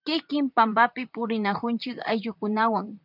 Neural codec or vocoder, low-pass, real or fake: vocoder, 44.1 kHz, 128 mel bands, Pupu-Vocoder; 5.4 kHz; fake